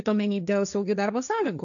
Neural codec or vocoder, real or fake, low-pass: codec, 16 kHz, 1.1 kbps, Voila-Tokenizer; fake; 7.2 kHz